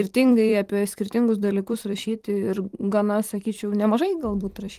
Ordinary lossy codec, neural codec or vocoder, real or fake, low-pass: Opus, 24 kbps; vocoder, 44.1 kHz, 128 mel bands every 256 samples, BigVGAN v2; fake; 14.4 kHz